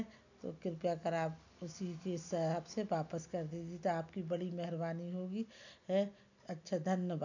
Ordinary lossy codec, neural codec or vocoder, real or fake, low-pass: none; none; real; 7.2 kHz